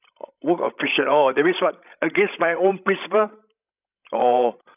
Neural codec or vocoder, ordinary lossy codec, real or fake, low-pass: codec, 16 kHz, 16 kbps, FreqCodec, larger model; none; fake; 3.6 kHz